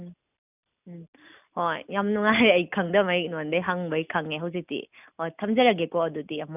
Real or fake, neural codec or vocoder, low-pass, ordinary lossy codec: real; none; 3.6 kHz; none